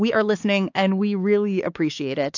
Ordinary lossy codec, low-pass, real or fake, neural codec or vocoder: AAC, 48 kbps; 7.2 kHz; fake; codec, 16 kHz, 4 kbps, X-Codec, HuBERT features, trained on LibriSpeech